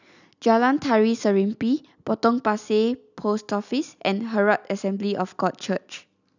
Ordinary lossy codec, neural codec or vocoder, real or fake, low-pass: none; none; real; 7.2 kHz